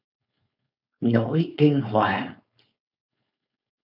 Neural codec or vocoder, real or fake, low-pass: codec, 16 kHz, 4.8 kbps, FACodec; fake; 5.4 kHz